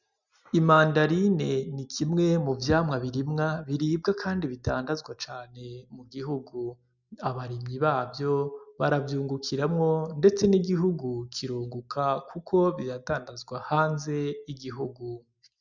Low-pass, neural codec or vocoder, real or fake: 7.2 kHz; none; real